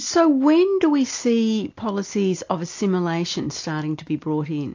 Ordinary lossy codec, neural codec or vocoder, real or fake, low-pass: AAC, 48 kbps; none; real; 7.2 kHz